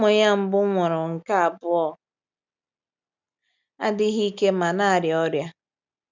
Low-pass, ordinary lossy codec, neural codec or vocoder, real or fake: 7.2 kHz; none; none; real